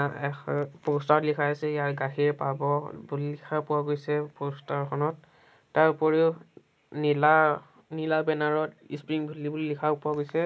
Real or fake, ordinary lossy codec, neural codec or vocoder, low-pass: real; none; none; none